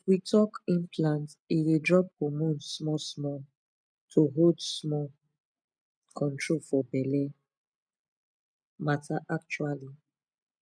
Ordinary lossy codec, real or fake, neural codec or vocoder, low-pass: none; real; none; 9.9 kHz